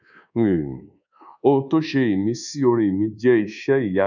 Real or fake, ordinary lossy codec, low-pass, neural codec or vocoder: fake; none; 7.2 kHz; codec, 24 kHz, 1.2 kbps, DualCodec